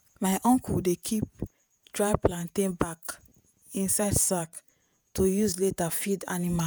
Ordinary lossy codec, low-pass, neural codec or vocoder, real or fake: none; none; vocoder, 48 kHz, 128 mel bands, Vocos; fake